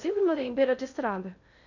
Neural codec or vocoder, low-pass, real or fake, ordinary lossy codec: codec, 16 kHz in and 24 kHz out, 0.6 kbps, FocalCodec, streaming, 2048 codes; 7.2 kHz; fake; none